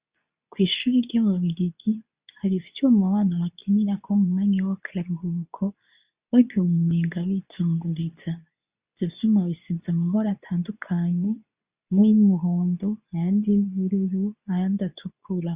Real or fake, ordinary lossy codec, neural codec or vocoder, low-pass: fake; Opus, 64 kbps; codec, 24 kHz, 0.9 kbps, WavTokenizer, medium speech release version 2; 3.6 kHz